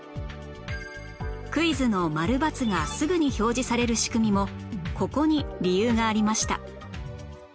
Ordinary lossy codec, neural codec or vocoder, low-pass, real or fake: none; none; none; real